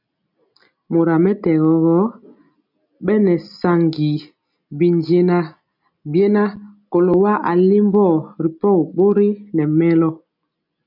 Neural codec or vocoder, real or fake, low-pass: none; real; 5.4 kHz